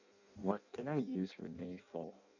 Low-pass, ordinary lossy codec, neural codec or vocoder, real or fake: 7.2 kHz; none; codec, 16 kHz in and 24 kHz out, 0.6 kbps, FireRedTTS-2 codec; fake